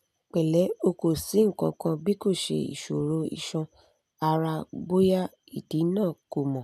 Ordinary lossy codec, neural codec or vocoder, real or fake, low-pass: none; none; real; 14.4 kHz